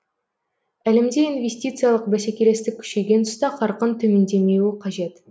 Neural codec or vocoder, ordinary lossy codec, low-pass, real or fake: none; none; none; real